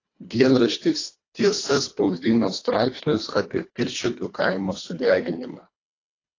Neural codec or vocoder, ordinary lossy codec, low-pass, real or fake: codec, 24 kHz, 1.5 kbps, HILCodec; AAC, 32 kbps; 7.2 kHz; fake